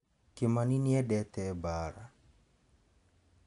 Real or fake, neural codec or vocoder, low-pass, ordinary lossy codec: real; none; 10.8 kHz; none